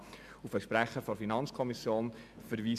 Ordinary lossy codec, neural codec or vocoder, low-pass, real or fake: AAC, 96 kbps; none; 14.4 kHz; real